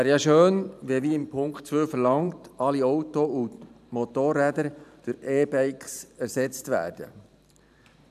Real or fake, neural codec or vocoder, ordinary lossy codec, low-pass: fake; vocoder, 44.1 kHz, 128 mel bands every 512 samples, BigVGAN v2; none; 14.4 kHz